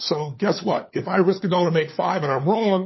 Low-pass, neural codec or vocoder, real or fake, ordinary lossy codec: 7.2 kHz; codec, 16 kHz, 4 kbps, FunCodec, trained on LibriTTS, 50 frames a second; fake; MP3, 24 kbps